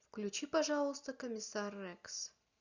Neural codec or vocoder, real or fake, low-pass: none; real; 7.2 kHz